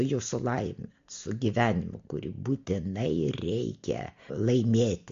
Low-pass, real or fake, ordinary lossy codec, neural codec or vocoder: 7.2 kHz; real; MP3, 48 kbps; none